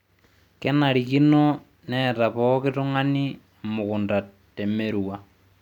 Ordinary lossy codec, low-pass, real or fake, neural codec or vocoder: none; 19.8 kHz; real; none